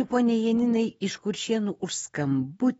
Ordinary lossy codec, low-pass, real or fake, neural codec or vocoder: AAC, 24 kbps; 19.8 kHz; fake; autoencoder, 48 kHz, 128 numbers a frame, DAC-VAE, trained on Japanese speech